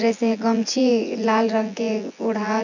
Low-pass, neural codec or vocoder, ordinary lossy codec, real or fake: 7.2 kHz; vocoder, 24 kHz, 100 mel bands, Vocos; none; fake